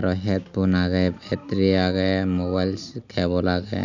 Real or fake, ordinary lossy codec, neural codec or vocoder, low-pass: real; none; none; 7.2 kHz